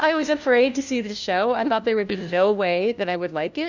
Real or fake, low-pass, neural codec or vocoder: fake; 7.2 kHz; codec, 16 kHz, 1 kbps, FunCodec, trained on LibriTTS, 50 frames a second